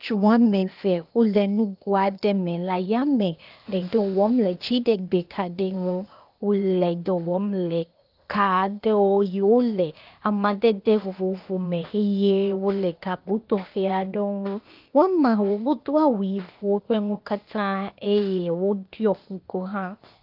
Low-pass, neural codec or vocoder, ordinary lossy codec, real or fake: 5.4 kHz; codec, 16 kHz, 0.8 kbps, ZipCodec; Opus, 32 kbps; fake